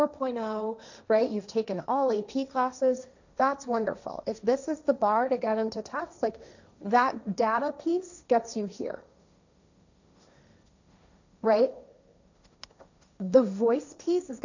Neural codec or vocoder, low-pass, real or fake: codec, 16 kHz, 1.1 kbps, Voila-Tokenizer; 7.2 kHz; fake